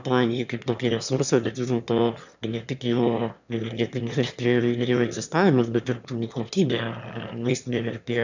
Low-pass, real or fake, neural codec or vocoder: 7.2 kHz; fake; autoencoder, 22.05 kHz, a latent of 192 numbers a frame, VITS, trained on one speaker